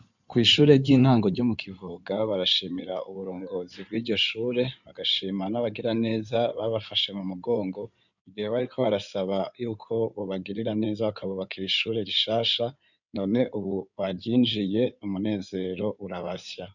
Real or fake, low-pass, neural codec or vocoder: fake; 7.2 kHz; codec, 16 kHz in and 24 kHz out, 2.2 kbps, FireRedTTS-2 codec